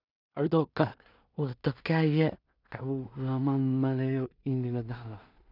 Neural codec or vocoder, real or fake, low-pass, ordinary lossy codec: codec, 16 kHz in and 24 kHz out, 0.4 kbps, LongCat-Audio-Codec, two codebook decoder; fake; 5.4 kHz; none